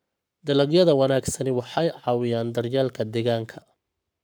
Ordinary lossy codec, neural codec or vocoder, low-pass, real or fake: none; codec, 44.1 kHz, 7.8 kbps, Pupu-Codec; none; fake